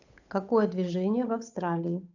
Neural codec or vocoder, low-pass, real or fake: codec, 16 kHz, 8 kbps, FunCodec, trained on Chinese and English, 25 frames a second; 7.2 kHz; fake